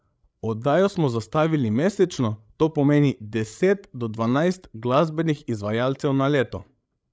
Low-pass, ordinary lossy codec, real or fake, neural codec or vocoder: none; none; fake; codec, 16 kHz, 8 kbps, FreqCodec, larger model